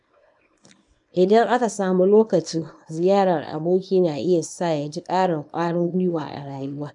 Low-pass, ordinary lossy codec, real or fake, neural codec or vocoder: 10.8 kHz; none; fake; codec, 24 kHz, 0.9 kbps, WavTokenizer, small release